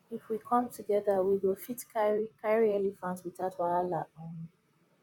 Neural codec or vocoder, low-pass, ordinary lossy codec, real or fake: vocoder, 44.1 kHz, 128 mel bands, Pupu-Vocoder; 19.8 kHz; none; fake